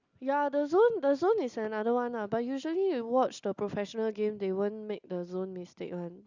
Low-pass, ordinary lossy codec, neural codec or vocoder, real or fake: 7.2 kHz; Opus, 64 kbps; none; real